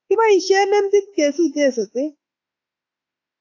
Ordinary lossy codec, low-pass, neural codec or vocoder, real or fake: AAC, 48 kbps; 7.2 kHz; autoencoder, 48 kHz, 32 numbers a frame, DAC-VAE, trained on Japanese speech; fake